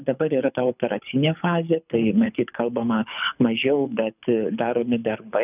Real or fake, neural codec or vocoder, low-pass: fake; codec, 24 kHz, 6 kbps, HILCodec; 3.6 kHz